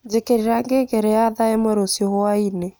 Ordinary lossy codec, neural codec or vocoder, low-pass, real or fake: none; none; none; real